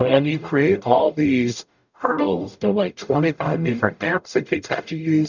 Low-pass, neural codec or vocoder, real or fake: 7.2 kHz; codec, 44.1 kHz, 0.9 kbps, DAC; fake